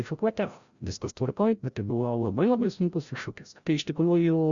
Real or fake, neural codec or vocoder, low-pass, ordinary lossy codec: fake; codec, 16 kHz, 0.5 kbps, FreqCodec, larger model; 7.2 kHz; Opus, 64 kbps